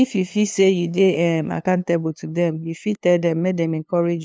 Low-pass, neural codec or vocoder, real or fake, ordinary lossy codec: none; codec, 16 kHz, 2 kbps, FunCodec, trained on LibriTTS, 25 frames a second; fake; none